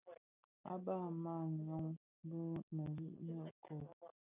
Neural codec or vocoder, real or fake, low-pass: none; real; 3.6 kHz